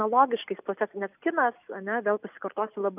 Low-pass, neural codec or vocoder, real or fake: 3.6 kHz; none; real